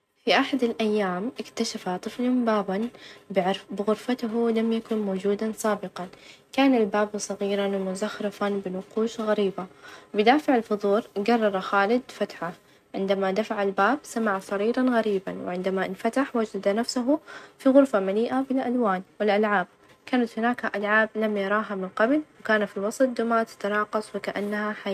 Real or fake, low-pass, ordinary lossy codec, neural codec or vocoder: real; 14.4 kHz; none; none